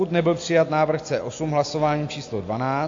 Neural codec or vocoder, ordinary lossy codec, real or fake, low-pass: none; AAC, 48 kbps; real; 7.2 kHz